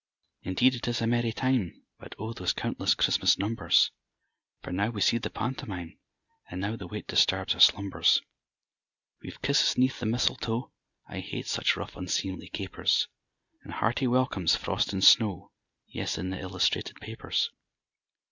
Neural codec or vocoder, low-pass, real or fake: none; 7.2 kHz; real